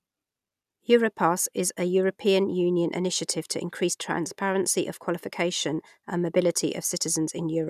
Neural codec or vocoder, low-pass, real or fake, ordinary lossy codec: none; 14.4 kHz; real; none